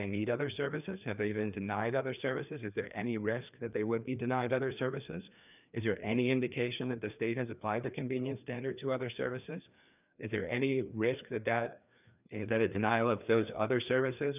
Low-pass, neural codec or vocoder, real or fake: 3.6 kHz; codec, 16 kHz, 2 kbps, FreqCodec, larger model; fake